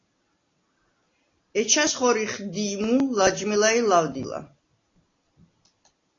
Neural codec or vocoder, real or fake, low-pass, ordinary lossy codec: none; real; 7.2 kHz; AAC, 32 kbps